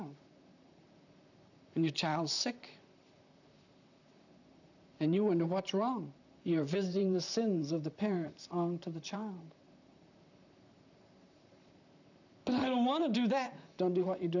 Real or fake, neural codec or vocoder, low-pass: fake; vocoder, 44.1 kHz, 128 mel bands, Pupu-Vocoder; 7.2 kHz